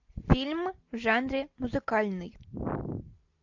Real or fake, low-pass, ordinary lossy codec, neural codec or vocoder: real; 7.2 kHz; AAC, 48 kbps; none